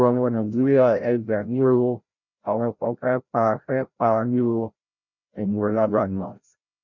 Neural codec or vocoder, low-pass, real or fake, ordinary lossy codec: codec, 16 kHz, 0.5 kbps, FreqCodec, larger model; 7.2 kHz; fake; none